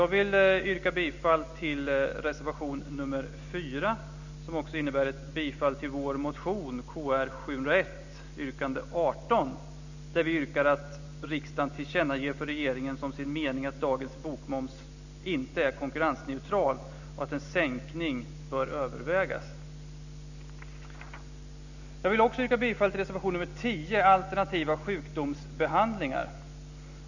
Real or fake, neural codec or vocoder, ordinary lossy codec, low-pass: real; none; none; 7.2 kHz